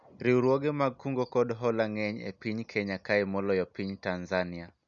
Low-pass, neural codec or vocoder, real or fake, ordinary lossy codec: 7.2 kHz; none; real; none